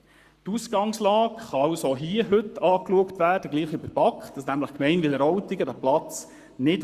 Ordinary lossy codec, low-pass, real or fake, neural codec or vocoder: Opus, 64 kbps; 14.4 kHz; fake; codec, 44.1 kHz, 7.8 kbps, Pupu-Codec